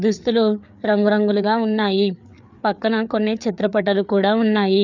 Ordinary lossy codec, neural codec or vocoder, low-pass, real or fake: none; codec, 16 kHz, 4 kbps, FreqCodec, larger model; 7.2 kHz; fake